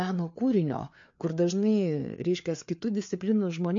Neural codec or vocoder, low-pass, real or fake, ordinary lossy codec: codec, 16 kHz, 4 kbps, FreqCodec, larger model; 7.2 kHz; fake; MP3, 48 kbps